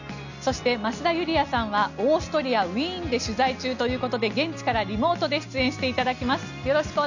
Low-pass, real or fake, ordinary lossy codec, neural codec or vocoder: 7.2 kHz; real; none; none